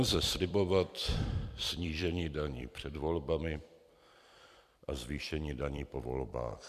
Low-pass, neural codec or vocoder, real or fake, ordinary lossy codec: 14.4 kHz; vocoder, 44.1 kHz, 128 mel bands every 256 samples, BigVGAN v2; fake; MP3, 96 kbps